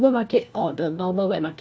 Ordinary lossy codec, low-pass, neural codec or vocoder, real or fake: none; none; codec, 16 kHz, 1 kbps, FunCodec, trained on LibriTTS, 50 frames a second; fake